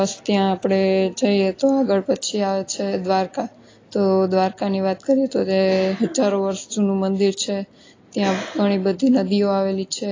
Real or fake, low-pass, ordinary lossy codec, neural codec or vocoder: real; 7.2 kHz; AAC, 32 kbps; none